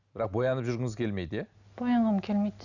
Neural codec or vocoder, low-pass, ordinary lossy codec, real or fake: none; 7.2 kHz; none; real